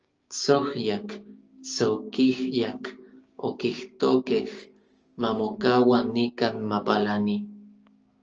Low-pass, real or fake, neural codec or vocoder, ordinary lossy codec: 7.2 kHz; fake; codec, 16 kHz, 6 kbps, DAC; Opus, 24 kbps